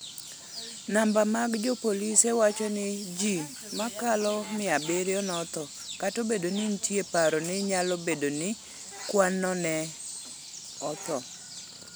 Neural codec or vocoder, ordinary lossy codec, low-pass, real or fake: none; none; none; real